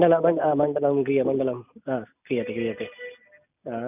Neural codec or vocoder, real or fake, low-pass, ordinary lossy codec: none; real; 3.6 kHz; none